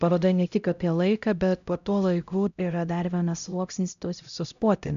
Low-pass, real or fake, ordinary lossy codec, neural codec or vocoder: 7.2 kHz; fake; AAC, 96 kbps; codec, 16 kHz, 0.5 kbps, X-Codec, HuBERT features, trained on LibriSpeech